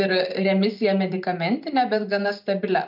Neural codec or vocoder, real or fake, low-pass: none; real; 5.4 kHz